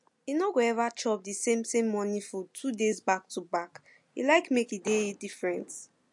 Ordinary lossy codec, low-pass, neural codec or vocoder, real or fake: MP3, 48 kbps; 10.8 kHz; none; real